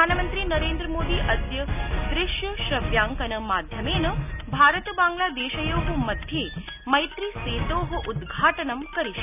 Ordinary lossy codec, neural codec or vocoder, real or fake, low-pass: none; none; real; 3.6 kHz